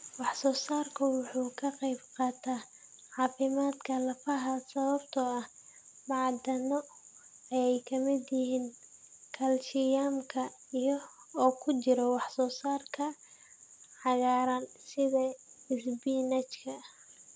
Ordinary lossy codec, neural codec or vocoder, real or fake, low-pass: none; none; real; none